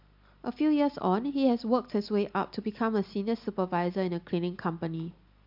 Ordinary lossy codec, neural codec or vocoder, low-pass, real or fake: MP3, 48 kbps; none; 5.4 kHz; real